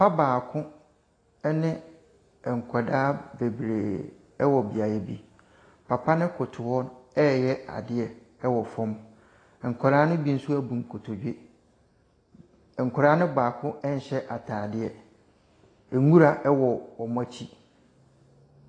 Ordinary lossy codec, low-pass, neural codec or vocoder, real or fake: AAC, 32 kbps; 9.9 kHz; none; real